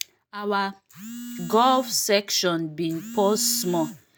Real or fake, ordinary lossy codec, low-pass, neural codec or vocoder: real; none; none; none